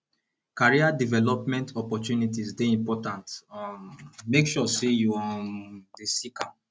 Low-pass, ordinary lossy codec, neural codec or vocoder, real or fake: none; none; none; real